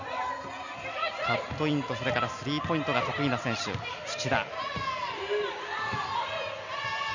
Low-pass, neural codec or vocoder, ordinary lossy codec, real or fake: 7.2 kHz; none; none; real